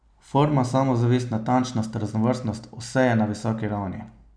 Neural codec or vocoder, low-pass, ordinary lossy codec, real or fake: none; 9.9 kHz; none; real